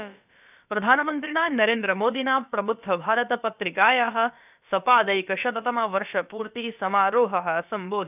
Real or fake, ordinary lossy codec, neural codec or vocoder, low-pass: fake; none; codec, 16 kHz, about 1 kbps, DyCAST, with the encoder's durations; 3.6 kHz